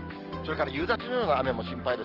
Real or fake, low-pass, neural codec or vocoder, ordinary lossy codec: real; 5.4 kHz; none; Opus, 16 kbps